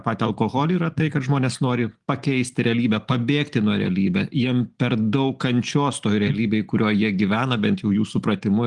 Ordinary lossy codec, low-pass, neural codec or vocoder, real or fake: Opus, 24 kbps; 10.8 kHz; none; real